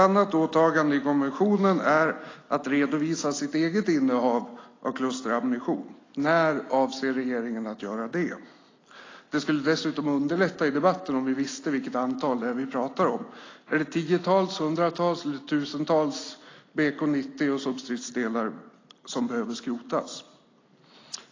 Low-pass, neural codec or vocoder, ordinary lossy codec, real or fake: 7.2 kHz; none; AAC, 32 kbps; real